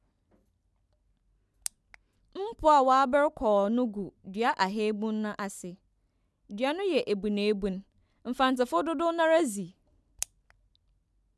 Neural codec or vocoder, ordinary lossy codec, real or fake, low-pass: none; none; real; none